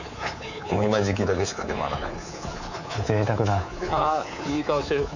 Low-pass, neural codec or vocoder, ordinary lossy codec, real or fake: 7.2 kHz; codec, 24 kHz, 3.1 kbps, DualCodec; none; fake